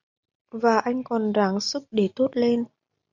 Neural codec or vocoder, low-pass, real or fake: none; 7.2 kHz; real